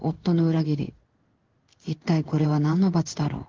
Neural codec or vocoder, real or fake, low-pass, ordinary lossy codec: codec, 16 kHz in and 24 kHz out, 1 kbps, XY-Tokenizer; fake; 7.2 kHz; Opus, 16 kbps